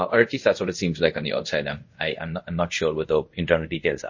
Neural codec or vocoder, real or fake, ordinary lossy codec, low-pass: codec, 24 kHz, 0.5 kbps, DualCodec; fake; MP3, 32 kbps; 7.2 kHz